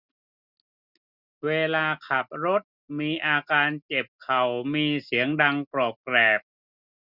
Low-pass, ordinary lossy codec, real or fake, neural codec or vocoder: 5.4 kHz; none; real; none